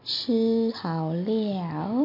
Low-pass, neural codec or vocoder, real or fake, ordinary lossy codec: 5.4 kHz; none; real; MP3, 32 kbps